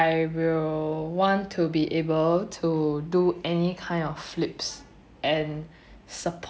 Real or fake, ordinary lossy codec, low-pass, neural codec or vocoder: real; none; none; none